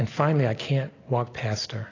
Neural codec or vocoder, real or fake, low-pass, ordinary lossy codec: none; real; 7.2 kHz; AAC, 32 kbps